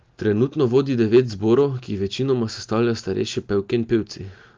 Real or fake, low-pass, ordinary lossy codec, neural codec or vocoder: real; 7.2 kHz; Opus, 32 kbps; none